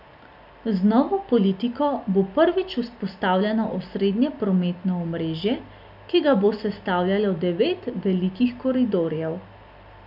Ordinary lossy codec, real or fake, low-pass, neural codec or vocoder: none; real; 5.4 kHz; none